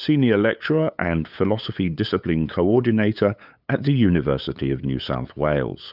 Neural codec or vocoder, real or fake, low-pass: codec, 16 kHz, 8 kbps, FunCodec, trained on LibriTTS, 25 frames a second; fake; 5.4 kHz